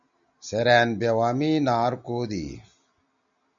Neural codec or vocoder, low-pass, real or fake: none; 7.2 kHz; real